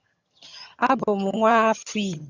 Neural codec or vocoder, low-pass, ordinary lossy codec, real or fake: vocoder, 22.05 kHz, 80 mel bands, HiFi-GAN; 7.2 kHz; Opus, 64 kbps; fake